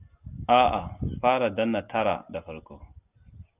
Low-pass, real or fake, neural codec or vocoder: 3.6 kHz; real; none